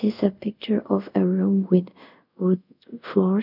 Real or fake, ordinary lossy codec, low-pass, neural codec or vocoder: fake; none; 5.4 kHz; codec, 24 kHz, 0.5 kbps, DualCodec